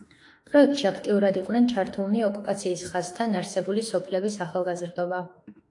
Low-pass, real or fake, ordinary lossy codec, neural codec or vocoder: 10.8 kHz; fake; AAC, 48 kbps; autoencoder, 48 kHz, 32 numbers a frame, DAC-VAE, trained on Japanese speech